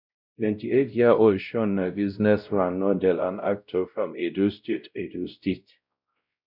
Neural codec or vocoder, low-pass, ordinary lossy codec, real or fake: codec, 16 kHz, 0.5 kbps, X-Codec, WavLM features, trained on Multilingual LibriSpeech; 5.4 kHz; none; fake